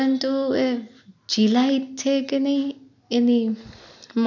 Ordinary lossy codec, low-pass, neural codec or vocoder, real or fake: none; 7.2 kHz; none; real